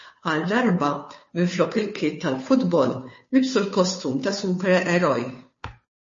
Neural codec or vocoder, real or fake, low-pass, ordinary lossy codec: codec, 16 kHz, 2 kbps, FunCodec, trained on Chinese and English, 25 frames a second; fake; 7.2 kHz; MP3, 32 kbps